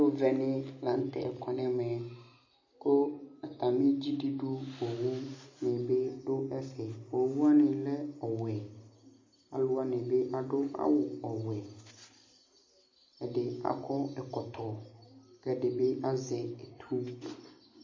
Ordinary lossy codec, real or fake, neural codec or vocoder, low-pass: MP3, 32 kbps; real; none; 7.2 kHz